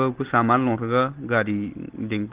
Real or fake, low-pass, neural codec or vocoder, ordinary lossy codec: real; 3.6 kHz; none; Opus, 16 kbps